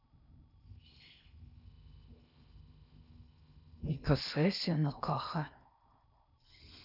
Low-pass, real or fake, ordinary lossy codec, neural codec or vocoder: 5.4 kHz; fake; none; codec, 16 kHz in and 24 kHz out, 0.6 kbps, FocalCodec, streaming, 2048 codes